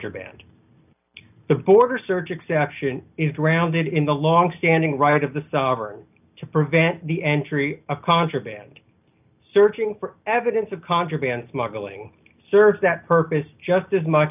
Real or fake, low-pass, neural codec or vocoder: real; 3.6 kHz; none